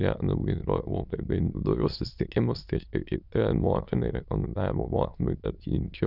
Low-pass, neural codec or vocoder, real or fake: 5.4 kHz; autoencoder, 22.05 kHz, a latent of 192 numbers a frame, VITS, trained on many speakers; fake